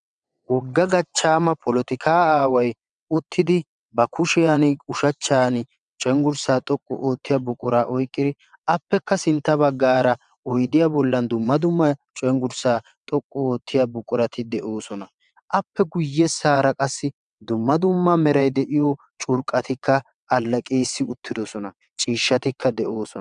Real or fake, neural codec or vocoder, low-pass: fake; vocoder, 22.05 kHz, 80 mel bands, WaveNeXt; 9.9 kHz